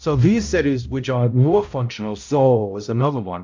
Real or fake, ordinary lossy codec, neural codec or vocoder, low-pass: fake; MP3, 64 kbps; codec, 16 kHz, 0.5 kbps, X-Codec, HuBERT features, trained on balanced general audio; 7.2 kHz